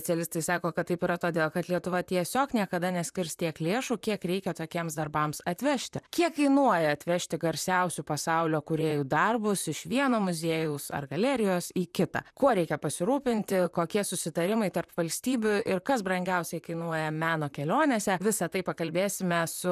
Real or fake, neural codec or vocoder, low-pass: fake; vocoder, 44.1 kHz, 128 mel bands, Pupu-Vocoder; 14.4 kHz